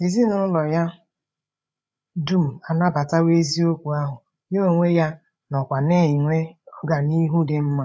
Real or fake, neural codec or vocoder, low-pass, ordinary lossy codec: fake; codec, 16 kHz, 8 kbps, FreqCodec, larger model; none; none